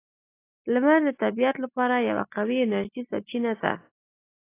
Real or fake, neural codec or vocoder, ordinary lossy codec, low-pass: real; none; AAC, 24 kbps; 3.6 kHz